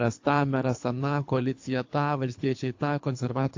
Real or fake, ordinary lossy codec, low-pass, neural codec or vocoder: fake; AAC, 48 kbps; 7.2 kHz; codec, 24 kHz, 3 kbps, HILCodec